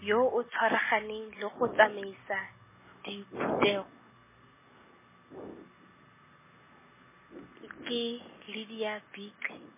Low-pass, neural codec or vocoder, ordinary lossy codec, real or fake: 3.6 kHz; none; MP3, 16 kbps; real